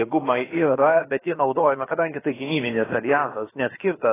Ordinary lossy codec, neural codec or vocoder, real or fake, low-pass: AAC, 16 kbps; codec, 16 kHz, about 1 kbps, DyCAST, with the encoder's durations; fake; 3.6 kHz